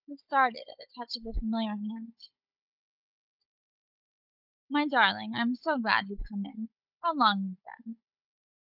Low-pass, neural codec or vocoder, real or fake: 5.4 kHz; codec, 16 kHz, 16 kbps, FunCodec, trained on Chinese and English, 50 frames a second; fake